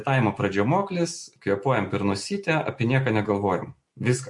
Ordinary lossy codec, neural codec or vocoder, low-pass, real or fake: MP3, 48 kbps; none; 10.8 kHz; real